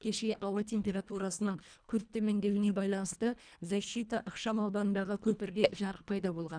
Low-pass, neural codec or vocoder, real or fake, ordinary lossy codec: 9.9 kHz; codec, 24 kHz, 1.5 kbps, HILCodec; fake; none